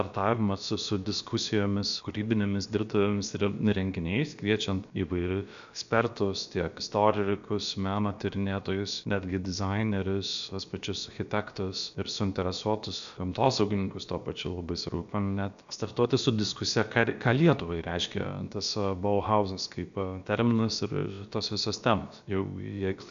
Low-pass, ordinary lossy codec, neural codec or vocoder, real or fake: 7.2 kHz; AAC, 96 kbps; codec, 16 kHz, about 1 kbps, DyCAST, with the encoder's durations; fake